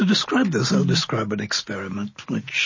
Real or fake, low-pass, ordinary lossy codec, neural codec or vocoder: real; 7.2 kHz; MP3, 32 kbps; none